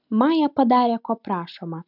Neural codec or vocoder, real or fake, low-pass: none; real; 5.4 kHz